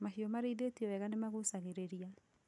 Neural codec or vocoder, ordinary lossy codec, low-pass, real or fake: none; none; 10.8 kHz; real